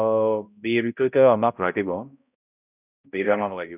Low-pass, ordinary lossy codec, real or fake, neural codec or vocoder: 3.6 kHz; none; fake; codec, 16 kHz, 0.5 kbps, X-Codec, HuBERT features, trained on balanced general audio